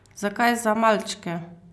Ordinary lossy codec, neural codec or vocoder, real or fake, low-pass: none; none; real; none